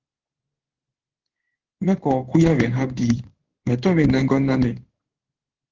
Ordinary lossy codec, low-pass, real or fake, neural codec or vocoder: Opus, 16 kbps; 7.2 kHz; fake; codec, 16 kHz in and 24 kHz out, 1 kbps, XY-Tokenizer